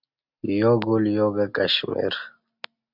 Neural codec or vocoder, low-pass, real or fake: none; 5.4 kHz; real